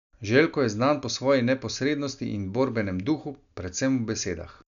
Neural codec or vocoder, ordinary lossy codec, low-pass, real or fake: none; none; 7.2 kHz; real